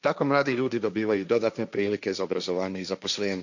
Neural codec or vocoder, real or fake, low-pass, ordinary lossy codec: codec, 16 kHz, 1.1 kbps, Voila-Tokenizer; fake; 7.2 kHz; none